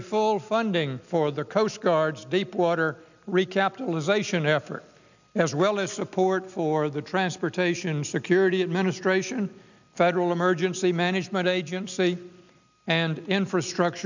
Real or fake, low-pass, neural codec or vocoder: real; 7.2 kHz; none